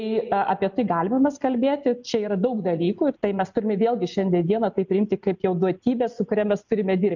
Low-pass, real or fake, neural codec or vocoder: 7.2 kHz; real; none